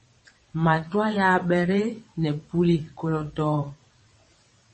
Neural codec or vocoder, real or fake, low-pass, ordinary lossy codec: vocoder, 22.05 kHz, 80 mel bands, WaveNeXt; fake; 9.9 kHz; MP3, 32 kbps